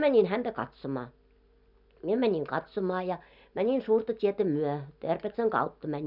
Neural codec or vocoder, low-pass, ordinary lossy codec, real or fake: none; 5.4 kHz; none; real